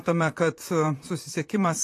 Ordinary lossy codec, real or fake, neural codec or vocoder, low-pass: AAC, 48 kbps; fake; vocoder, 44.1 kHz, 128 mel bands, Pupu-Vocoder; 14.4 kHz